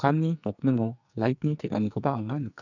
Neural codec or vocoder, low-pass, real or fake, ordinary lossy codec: codec, 44.1 kHz, 2.6 kbps, SNAC; 7.2 kHz; fake; none